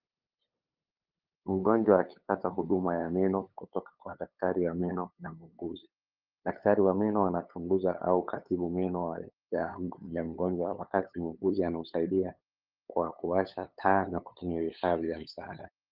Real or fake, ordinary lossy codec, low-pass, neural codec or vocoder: fake; Opus, 24 kbps; 5.4 kHz; codec, 16 kHz, 8 kbps, FunCodec, trained on LibriTTS, 25 frames a second